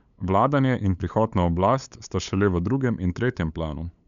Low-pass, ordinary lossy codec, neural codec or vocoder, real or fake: 7.2 kHz; MP3, 96 kbps; codec, 16 kHz, 8 kbps, FunCodec, trained on LibriTTS, 25 frames a second; fake